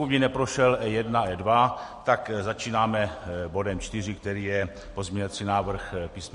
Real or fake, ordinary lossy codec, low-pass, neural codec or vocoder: real; MP3, 48 kbps; 14.4 kHz; none